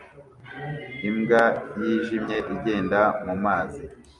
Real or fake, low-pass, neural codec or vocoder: real; 10.8 kHz; none